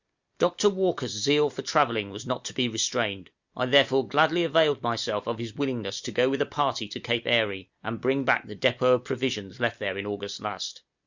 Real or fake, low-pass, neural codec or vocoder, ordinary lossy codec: real; 7.2 kHz; none; Opus, 64 kbps